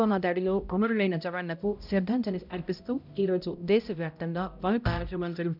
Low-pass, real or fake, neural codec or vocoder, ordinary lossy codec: 5.4 kHz; fake; codec, 16 kHz, 0.5 kbps, X-Codec, HuBERT features, trained on balanced general audio; none